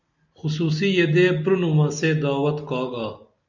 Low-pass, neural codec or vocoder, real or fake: 7.2 kHz; none; real